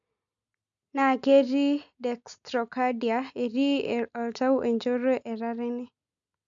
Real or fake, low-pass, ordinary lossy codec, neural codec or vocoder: real; 7.2 kHz; MP3, 64 kbps; none